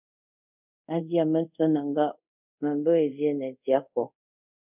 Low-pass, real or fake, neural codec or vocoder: 3.6 kHz; fake; codec, 24 kHz, 0.5 kbps, DualCodec